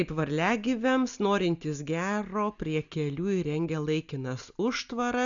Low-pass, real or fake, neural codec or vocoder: 7.2 kHz; real; none